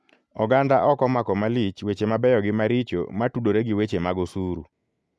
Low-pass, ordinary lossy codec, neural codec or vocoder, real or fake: none; none; none; real